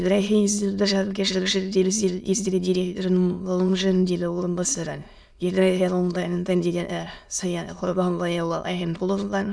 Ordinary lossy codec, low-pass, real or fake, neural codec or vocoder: none; none; fake; autoencoder, 22.05 kHz, a latent of 192 numbers a frame, VITS, trained on many speakers